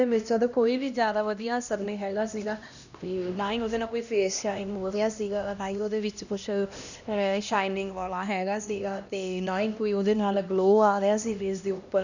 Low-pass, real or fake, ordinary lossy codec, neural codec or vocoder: 7.2 kHz; fake; none; codec, 16 kHz, 1 kbps, X-Codec, HuBERT features, trained on LibriSpeech